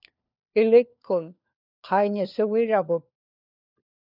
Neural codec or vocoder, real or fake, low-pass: codec, 16 kHz, 4 kbps, FunCodec, trained on LibriTTS, 50 frames a second; fake; 5.4 kHz